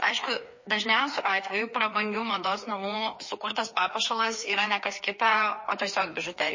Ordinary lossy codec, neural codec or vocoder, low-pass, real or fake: MP3, 32 kbps; codec, 16 kHz, 2 kbps, FreqCodec, larger model; 7.2 kHz; fake